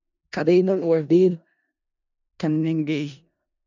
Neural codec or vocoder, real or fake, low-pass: codec, 16 kHz in and 24 kHz out, 0.4 kbps, LongCat-Audio-Codec, four codebook decoder; fake; 7.2 kHz